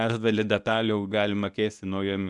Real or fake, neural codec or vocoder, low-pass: fake; codec, 24 kHz, 0.9 kbps, WavTokenizer, medium speech release version 1; 10.8 kHz